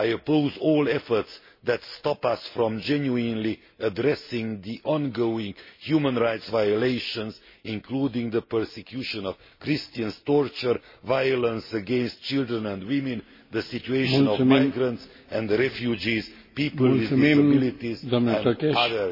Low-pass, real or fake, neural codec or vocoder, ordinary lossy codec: 5.4 kHz; real; none; MP3, 24 kbps